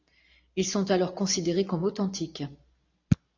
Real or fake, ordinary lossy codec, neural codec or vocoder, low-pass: real; AAC, 48 kbps; none; 7.2 kHz